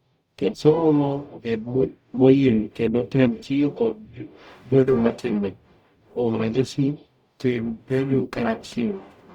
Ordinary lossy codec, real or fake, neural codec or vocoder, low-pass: Opus, 64 kbps; fake; codec, 44.1 kHz, 0.9 kbps, DAC; 19.8 kHz